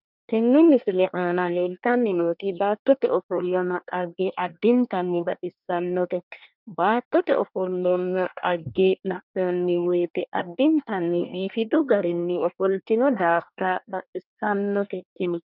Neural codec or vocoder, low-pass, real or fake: codec, 24 kHz, 1 kbps, SNAC; 5.4 kHz; fake